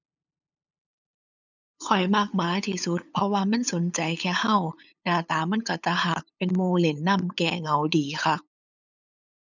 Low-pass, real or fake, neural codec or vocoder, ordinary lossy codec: 7.2 kHz; fake; codec, 16 kHz, 8 kbps, FunCodec, trained on LibriTTS, 25 frames a second; none